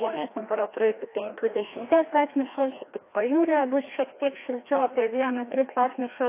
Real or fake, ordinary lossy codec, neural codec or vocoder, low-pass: fake; AAC, 24 kbps; codec, 16 kHz, 1 kbps, FreqCodec, larger model; 3.6 kHz